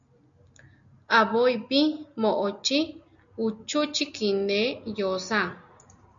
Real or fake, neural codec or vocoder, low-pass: real; none; 7.2 kHz